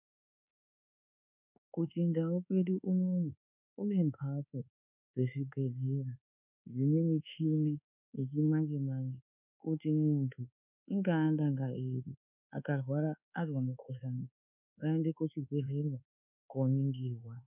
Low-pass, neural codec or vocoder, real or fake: 3.6 kHz; codec, 24 kHz, 1.2 kbps, DualCodec; fake